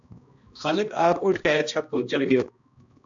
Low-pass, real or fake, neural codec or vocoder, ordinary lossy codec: 7.2 kHz; fake; codec, 16 kHz, 1 kbps, X-Codec, HuBERT features, trained on balanced general audio; AAC, 64 kbps